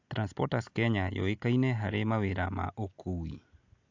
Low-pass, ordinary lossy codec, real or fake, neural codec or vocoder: 7.2 kHz; AAC, 48 kbps; real; none